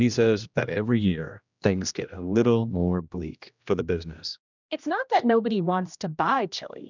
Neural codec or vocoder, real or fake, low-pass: codec, 16 kHz, 1 kbps, X-Codec, HuBERT features, trained on general audio; fake; 7.2 kHz